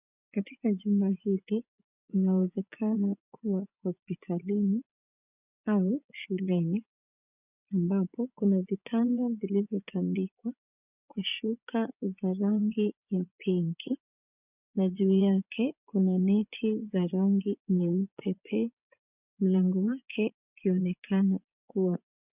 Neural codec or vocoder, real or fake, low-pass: vocoder, 24 kHz, 100 mel bands, Vocos; fake; 3.6 kHz